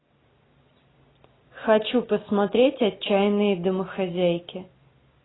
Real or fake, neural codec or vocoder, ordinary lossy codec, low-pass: real; none; AAC, 16 kbps; 7.2 kHz